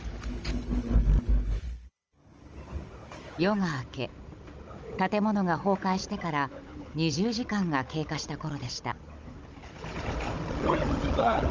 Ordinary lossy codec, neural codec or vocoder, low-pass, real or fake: Opus, 24 kbps; codec, 16 kHz, 16 kbps, FunCodec, trained on Chinese and English, 50 frames a second; 7.2 kHz; fake